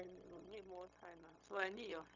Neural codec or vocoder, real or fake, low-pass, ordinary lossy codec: codec, 16 kHz, 0.4 kbps, LongCat-Audio-Codec; fake; 7.2 kHz; none